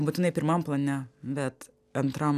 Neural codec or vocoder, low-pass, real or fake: none; 14.4 kHz; real